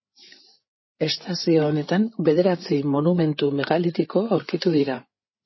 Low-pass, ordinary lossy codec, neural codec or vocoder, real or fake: 7.2 kHz; MP3, 24 kbps; codec, 16 kHz, 8 kbps, FreqCodec, larger model; fake